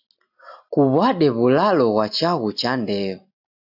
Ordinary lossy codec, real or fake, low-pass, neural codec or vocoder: AAC, 48 kbps; real; 5.4 kHz; none